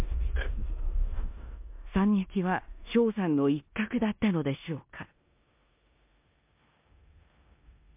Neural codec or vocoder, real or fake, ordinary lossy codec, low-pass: codec, 16 kHz in and 24 kHz out, 0.9 kbps, LongCat-Audio-Codec, four codebook decoder; fake; MP3, 32 kbps; 3.6 kHz